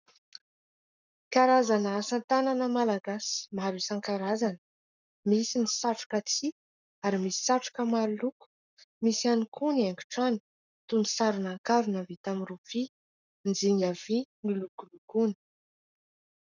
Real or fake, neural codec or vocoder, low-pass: fake; codec, 44.1 kHz, 7.8 kbps, Pupu-Codec; 7.2 kHz